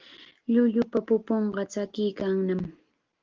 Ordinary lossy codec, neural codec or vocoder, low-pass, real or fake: Opus, 16 kbps; none; 7.2 kHz; real